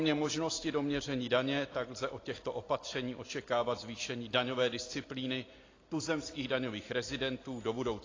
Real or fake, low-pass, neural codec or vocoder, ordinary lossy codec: real; 7.2 kHz; none; AAC, 32 kbps